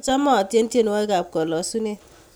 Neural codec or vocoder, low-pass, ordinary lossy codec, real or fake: none; none; none; real